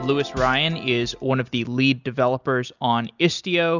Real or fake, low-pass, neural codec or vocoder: real; 7.2 kHz; none